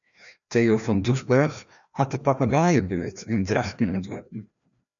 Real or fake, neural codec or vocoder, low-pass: fake; codec, 16 kHz, 1 kbps, FreqCodec, larger model; 7.2 kHz